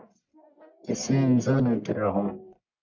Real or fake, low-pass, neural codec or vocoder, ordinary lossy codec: fake; 7.2 kHz; codec, 44.1 kHz, 1.7 kbps, Pupu-Codec; MP3, 64 kbps